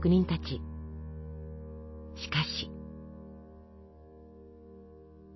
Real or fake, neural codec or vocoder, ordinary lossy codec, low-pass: real; none; MP3, 24 kbps; 7.2 kHz